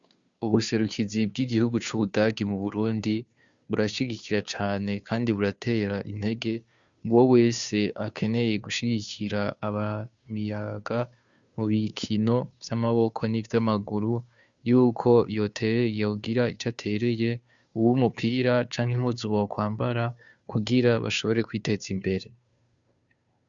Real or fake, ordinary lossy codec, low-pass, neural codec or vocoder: fake; Opus, 64 kbps; 7.2 kHz; codec, 16 kHz, 2 kbps, FunCodec, trained on Chinese and English, 25 frames a second